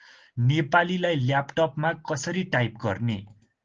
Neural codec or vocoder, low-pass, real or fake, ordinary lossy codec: none; 7.2 kHz; real; Opus, 16 kbps